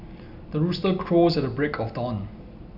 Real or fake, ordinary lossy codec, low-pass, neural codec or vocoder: real; none; 5.4 kHz; none